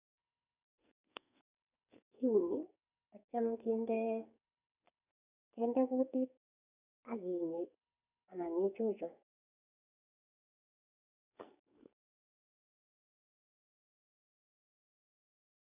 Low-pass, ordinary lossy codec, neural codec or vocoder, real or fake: 3.6 kHz; none; codec, 44.1 kHz, 2.6 kbps, SNAC; fake